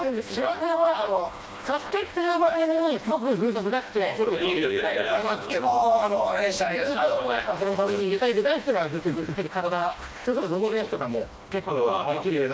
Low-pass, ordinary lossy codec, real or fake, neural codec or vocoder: none; none; fake; codec, 16 kHz, 1 kbps, FreqCodec, smaller model